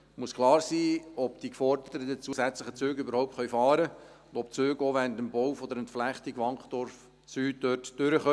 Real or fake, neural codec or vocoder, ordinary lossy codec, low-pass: real; none; none; none